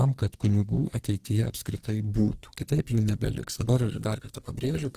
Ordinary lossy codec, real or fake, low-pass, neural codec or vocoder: Opus, 16 kbps; fake; 14.4 kHz; codec, 44.1 kHz, 2.6 kbps, SNAC